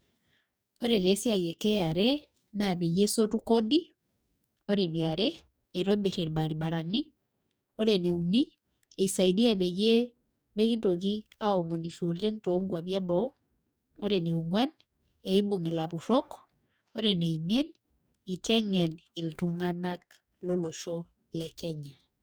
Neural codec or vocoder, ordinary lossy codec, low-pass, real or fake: codec, 44.1 kHz, 2.6 kbps, DAC; none; none; fake